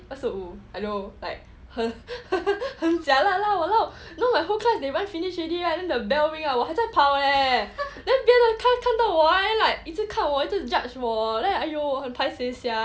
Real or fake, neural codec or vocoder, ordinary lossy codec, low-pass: real; none; none; none